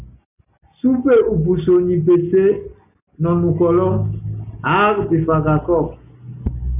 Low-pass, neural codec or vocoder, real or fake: 3.6 kHz; none; real